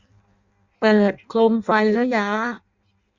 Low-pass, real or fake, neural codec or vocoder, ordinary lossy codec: 7.2 kHz; fake; codec, 16 kHz in and 24 kHz out, 0.6 kbps, FireRedTTS-2 codec; Opus, 64 kbps